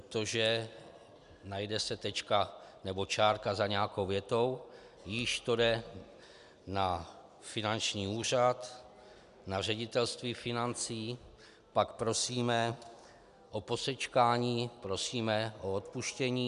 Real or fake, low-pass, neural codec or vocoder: real; 10.8 kHz; none